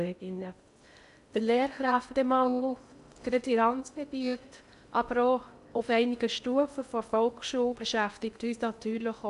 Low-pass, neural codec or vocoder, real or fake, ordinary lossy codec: 10.8 kHz; codec, 16 kHz in and 24 kHz out, 0.6 kbps, FocalCodec, streaming, 2048 codes; fake; none